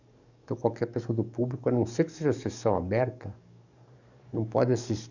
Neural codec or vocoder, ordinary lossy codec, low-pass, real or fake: codec, 44.1 kHz, 7.8 kbps, DAC; none; 7.2 kHz; fake